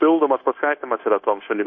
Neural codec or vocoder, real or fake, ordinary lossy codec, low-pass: codec, 16 kHz, 0.9 kbps, LongCat-Audio-Codec; fake; MP3, 32 kbps; 7.2 kHz